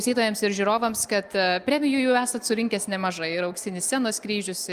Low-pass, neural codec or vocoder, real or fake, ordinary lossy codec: 14.4 kHz; none; real; Opus, 32 kbps